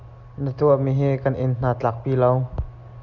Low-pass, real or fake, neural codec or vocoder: 7.2 kHz; real; none